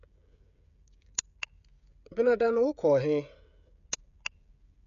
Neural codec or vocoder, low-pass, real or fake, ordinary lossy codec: codec, 16 kHz, 16 kbps, FreqCodec, smaller model; 7.2 kHz; fake; none